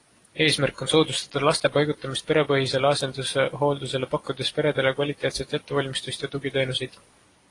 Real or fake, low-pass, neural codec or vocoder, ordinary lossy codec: real; 10.8 kHz; none; AAC, 32 kbps